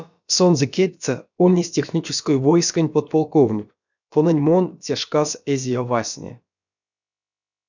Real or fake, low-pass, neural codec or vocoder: fake; 7.2 kHz; codec, 16 kHz, about 1 kbps, DyCAST, with the encoder's durations